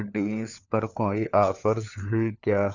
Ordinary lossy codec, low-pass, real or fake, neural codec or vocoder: none; 7.2 kHz; fake; codec, 16 kHz, 4 kbps, FunCodec, trained on LibriTTS, 50 frames a second